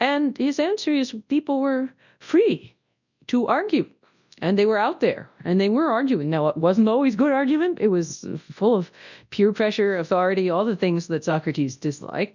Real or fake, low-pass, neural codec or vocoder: fake; 7.2 kHz; codec, 24 kHz, 0.9 kbps, WavTokenizer, large speech release